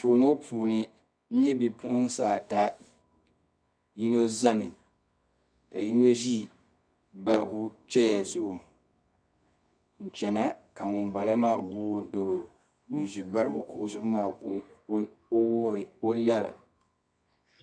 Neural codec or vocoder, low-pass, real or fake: codec, 24 kHz, 0.9 kbps, WavTokenizer, medium music audio release; 9.9 kHz; fake